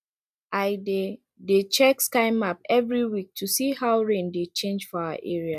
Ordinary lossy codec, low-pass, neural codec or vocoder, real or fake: none; 14.4 kHz; none; real